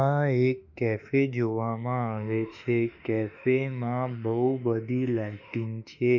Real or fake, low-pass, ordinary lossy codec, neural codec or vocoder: fake; 7.2 kHz; none; autoencoder, 48 kHz, 32 numbers a frame, DAC-VAE, trained on Japanese speech